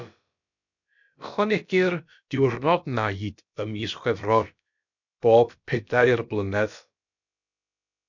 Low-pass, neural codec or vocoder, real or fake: 7.2 kHz; codec, 16 kHz, about 1 kbps, DyCAST, with the encoder's durations; fake